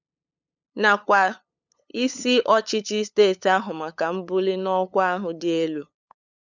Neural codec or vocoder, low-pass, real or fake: codec, 16 kHz, 8 kbps, FunCodec, trained on LibriTTS, 25 frames a second; 7.2 kHz; fake